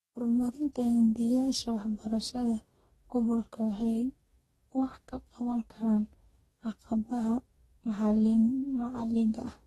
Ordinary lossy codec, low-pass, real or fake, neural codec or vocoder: AAC, 32 kbps; 19.8 kHz; fake; codec, 44.1 kHz, 2.6 kbps, DAC